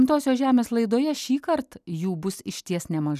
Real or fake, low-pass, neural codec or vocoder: real; 14.4 kHz; none